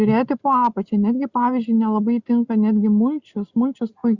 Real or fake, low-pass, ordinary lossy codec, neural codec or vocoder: real; 7.2 kHz; Opus, 64 kbps; none